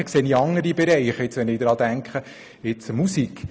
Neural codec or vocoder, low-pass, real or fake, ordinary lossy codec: none; none; real; none